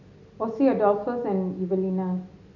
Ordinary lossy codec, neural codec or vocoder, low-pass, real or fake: none; none; 7.2 kHz; real